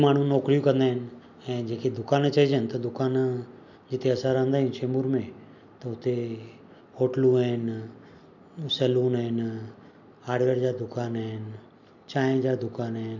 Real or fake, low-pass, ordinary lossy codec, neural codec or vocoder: real; 7.2 kHz; none; none